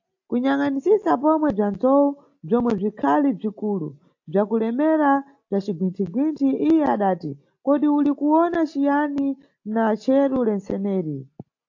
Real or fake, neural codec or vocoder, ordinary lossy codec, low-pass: real; none; AAC, 48 kbps; 7.2 kHz